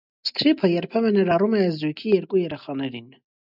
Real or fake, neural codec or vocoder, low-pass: real; none; 5.4 kHz